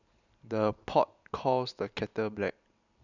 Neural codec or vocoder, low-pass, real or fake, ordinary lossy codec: none; 7.2 kHz; real; none